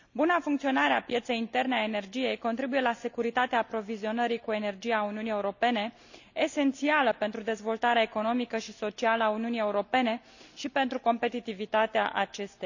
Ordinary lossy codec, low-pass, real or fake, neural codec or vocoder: none; 7.2 kHz; real; none